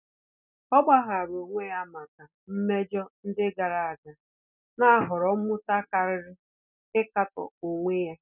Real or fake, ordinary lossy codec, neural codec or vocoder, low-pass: real; none; none; 3.6 kHz